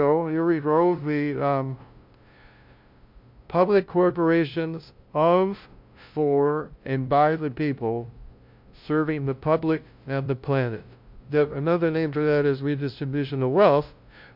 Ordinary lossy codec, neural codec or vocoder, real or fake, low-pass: MP3, 48 kbps; codec, 16 kHz, 0.5 kbps, FunCodec, trained on LibriTTS, 25 frames a second; fake; 5.4 kHz